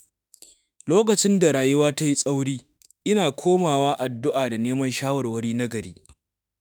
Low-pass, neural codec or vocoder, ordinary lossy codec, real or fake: none; autoencoder, 48 kHz, 32 numbers a frame, DAC-VAE, trained on Japanese speech; none; fake